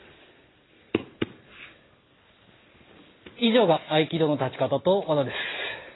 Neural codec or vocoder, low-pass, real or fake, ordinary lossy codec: none; 7.2 kHz; real; AAC, 16 kbps